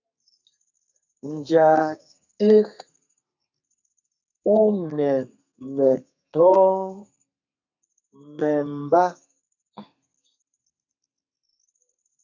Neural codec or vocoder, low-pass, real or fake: codec, 32 kHz, 1.9 kbps, SNAC; 7.2 kHz; fake